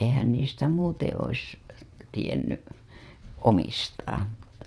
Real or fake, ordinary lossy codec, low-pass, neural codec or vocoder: fake; none; none; vocoder, 22.05 kHz, 80 mel bands, WaveNeXt